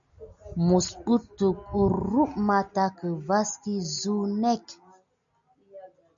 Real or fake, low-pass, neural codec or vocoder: real; 7.2 kHz; none